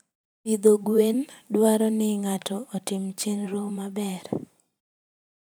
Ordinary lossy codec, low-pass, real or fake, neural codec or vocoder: none; none; fake; vocoder, 44.1 kHz, 128 mel bands every 512 samples, BigVGAN v2